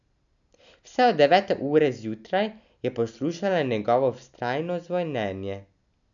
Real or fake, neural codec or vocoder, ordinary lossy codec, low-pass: real; none; none; 7.2 kHz